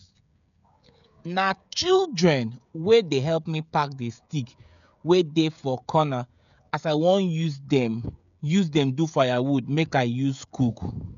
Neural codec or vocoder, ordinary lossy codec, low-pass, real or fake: codec, 16 kHz, 16 kbps, FreqCodec, smaller model; none; 7.2 kHz; fake